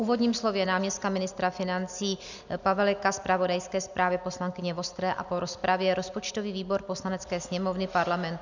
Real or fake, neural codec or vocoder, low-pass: real; none; 7.2 kHz